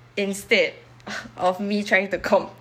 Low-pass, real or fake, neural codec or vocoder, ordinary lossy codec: 19.8 kHz; fake; codec, 44.1 kHz, 7.8 kbps, DAC; none